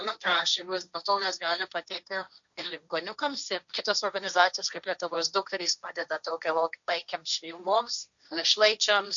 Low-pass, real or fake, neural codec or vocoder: 7.2 kHz; fake; codec, 16 kHz, 1.1 kbps, Voila-Tokenizer